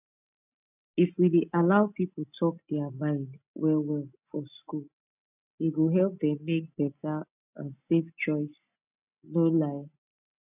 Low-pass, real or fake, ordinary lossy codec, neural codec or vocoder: 3.6 kHz; real; none; none